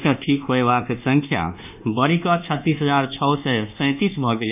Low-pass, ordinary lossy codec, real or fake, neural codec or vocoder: 3.6 kHz; none; fake; codec, 24 kHz, 1.2 kbps, DualCodec